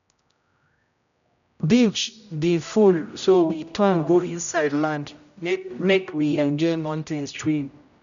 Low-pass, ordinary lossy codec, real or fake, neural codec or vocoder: 7.2 kHz; MP3, 96 kbps; fake; codec, 16 kHz, 0.5 kbps, X-Codec, HuBERT features, trained on general audio